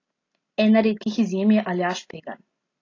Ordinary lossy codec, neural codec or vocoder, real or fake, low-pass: AAC, 32 kbps; none; real; 7.2 kHz